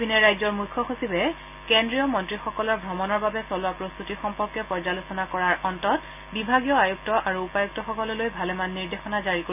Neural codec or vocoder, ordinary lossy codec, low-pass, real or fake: none; none; 3.6 kHz; real